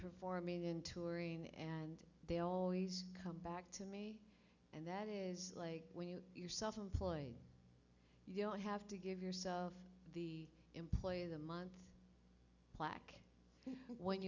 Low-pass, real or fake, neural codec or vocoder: 7.2 kHz; real; none